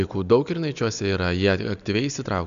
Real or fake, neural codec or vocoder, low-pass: real; none; 7.2 kHz